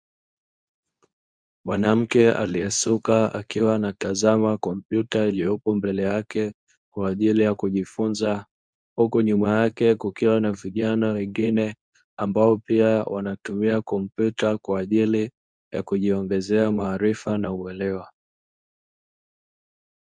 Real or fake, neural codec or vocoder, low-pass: fake; codec, 24 kHz, 0.9 kbps, WavTokenizer, medium speech release version 2; 9.9 kHz